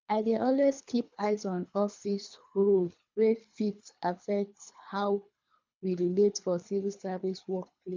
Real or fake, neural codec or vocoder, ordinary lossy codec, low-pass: fake; codec, 24 kHz, 3 kbps, HILCodec; MP3, 64 kbps; 7.2 kHz